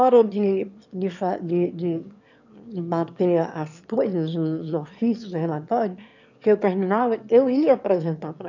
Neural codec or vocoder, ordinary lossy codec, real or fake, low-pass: autoencoder, 22.05 kHz, a latent of 192 numbers a frame, VITS, trained on one speaker; none; fake; 7.2 kHz